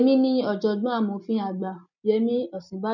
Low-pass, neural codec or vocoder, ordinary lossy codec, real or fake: 7.2 kHz; none; none; real